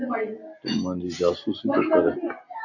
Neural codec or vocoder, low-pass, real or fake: none; 7.2 kHz; real